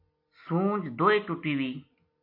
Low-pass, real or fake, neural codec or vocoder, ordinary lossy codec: 5.4 kHz; real; none; MP3, 32 kbps